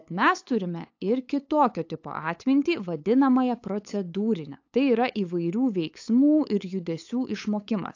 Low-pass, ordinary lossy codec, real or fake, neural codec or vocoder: 7.2 kHz; AAC, 48 kbps; fake; codec, 16 kHz, 8 kbps, FunCodec, trained on LibriTTS, 25 frames a second